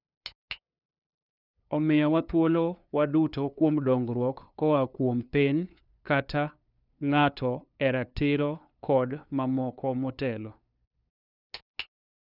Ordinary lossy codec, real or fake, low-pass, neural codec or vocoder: none; fake; 5.4 kHz; codec, 16 kHz, 2 kbps, FunCodec, trained on LibriTTS, 25 frames a second